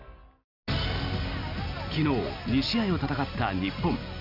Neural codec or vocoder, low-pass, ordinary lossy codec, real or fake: none; 5.4 kHz; Opus, 64 kbps; real